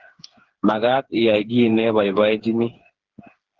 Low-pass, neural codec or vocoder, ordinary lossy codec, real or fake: 7.2 kHz; codec, 16 kHz, 4 kbps, FreqCodec, smaller model; Opus, 16 kbps; fake